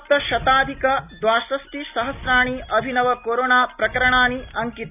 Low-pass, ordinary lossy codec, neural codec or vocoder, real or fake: 3.6 kHz; none; none; real